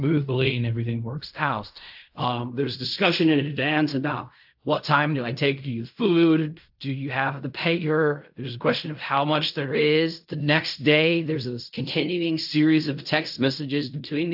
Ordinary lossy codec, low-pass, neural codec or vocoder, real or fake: AAC, 48 kbps; 5.4 kHz; codec, 16 kHz in and 24 kHz out, 0.4 kbps, LongCat-Audio-Codec, fine tuned four codebook decoder; fake